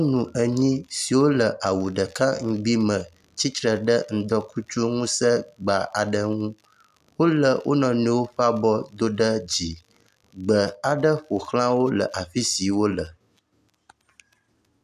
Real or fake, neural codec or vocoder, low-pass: real; none; 14.4 kHz